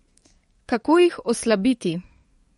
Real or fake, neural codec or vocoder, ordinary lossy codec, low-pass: fake; codec, 44.1 kHz, 7.8 kbps, DAC; MP3, 48 kbps; 19.8 kHz